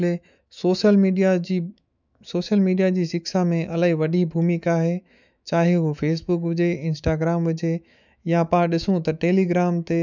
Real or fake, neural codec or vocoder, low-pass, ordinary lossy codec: fake; autoencoder, 48 kHz, 128 numbers a frame, DAC-VAE, trained on Japanese speech; 7.2 kHz; none